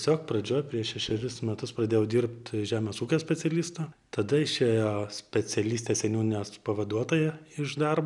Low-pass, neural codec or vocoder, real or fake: 10.8 kHz; none; real